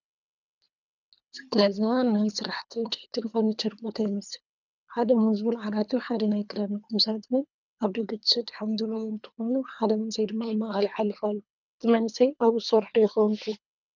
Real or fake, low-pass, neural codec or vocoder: fake; 7.2 kHz; codec, 24 kHz, 3 kbps, HILCodec